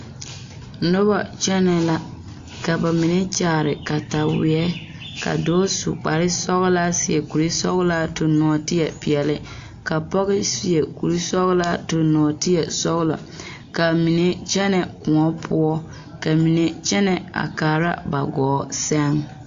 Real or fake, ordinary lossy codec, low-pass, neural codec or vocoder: real; AAC, 48 kbps; 7.2 kHz; none